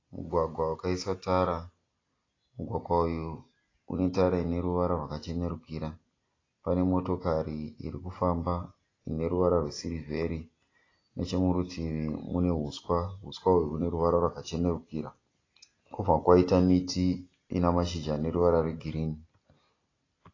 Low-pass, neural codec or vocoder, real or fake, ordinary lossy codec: 7.2 kHz; none; real; AAC, 32 kbps